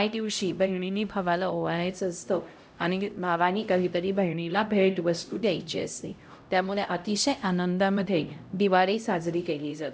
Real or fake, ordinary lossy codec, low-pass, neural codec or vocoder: fake; none; none; codec, 16 kHz, 0.5 kbps, X-Codec, HuBERT features, trained on LibriSpeech